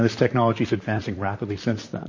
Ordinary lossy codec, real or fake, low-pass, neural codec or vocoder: MP3, 32 kbps; fake; 7.2 kHz; vocoder, 44.1 kHz, 128 mel bands, Pupu-Vocoder